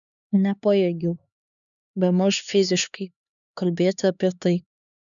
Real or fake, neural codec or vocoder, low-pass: fake; codec, 16 kHz, 2 kbps, X-Codec, HuBERT features, trained on LibriSpeech; 7.2 kHz